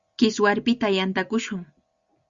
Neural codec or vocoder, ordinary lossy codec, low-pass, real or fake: none; Opus, 64 kbps; 7.2 kHz; real